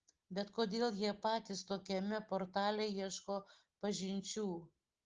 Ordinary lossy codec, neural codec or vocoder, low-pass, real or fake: Opus, 16 kbps; none; 7.2 kHz; real